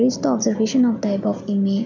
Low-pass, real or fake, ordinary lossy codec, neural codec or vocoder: 7.2 kHz; real; none; none